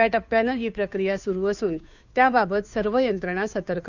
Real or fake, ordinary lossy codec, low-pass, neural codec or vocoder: fake; none; 7.2 kHz; codec, 16 kHz, 2 kbps, FunCodec, trained on Chinese and English, 25 frames a second